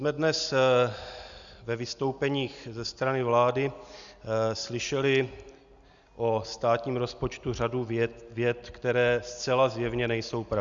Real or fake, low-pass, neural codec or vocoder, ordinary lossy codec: real; 7.2 kHz; none; Opus, 64 kbps